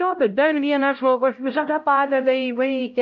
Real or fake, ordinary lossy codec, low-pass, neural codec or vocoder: fake; AAC, 64 kbps; 7.2 kHz; codec, 16 kHz, 0.5 kbps, X-Codec, HuBERT features, trained on LibriSpeech